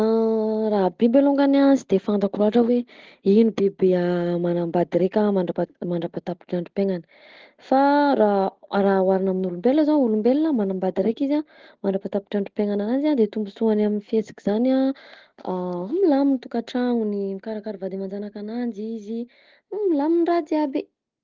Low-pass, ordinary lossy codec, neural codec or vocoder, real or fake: 7.2 kHz; Opus, 16 kbps; none; real